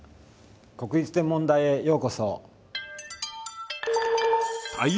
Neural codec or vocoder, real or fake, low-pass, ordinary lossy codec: none; real; none; none